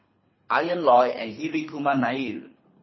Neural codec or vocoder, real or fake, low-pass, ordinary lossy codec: codec, 24 kHz, 3 kbps, HILCodec; fake; 7.2 kHz; MP3, 24 kbps